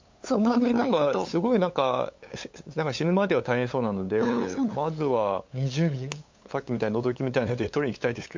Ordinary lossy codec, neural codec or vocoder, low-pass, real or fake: MP3, 48 kbps; codec, 16 kHz, 8 kbps, FunCodec, trained on LibriTTS, 25 frames a second; 7.2 kHz; fake